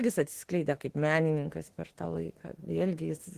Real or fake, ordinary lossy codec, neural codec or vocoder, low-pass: fake; Opus, 16 kbps; autoencoder, 48 kHz, 32 numbers a frame, DAC-VAE, trained on Japanese speech; 14.4 kHz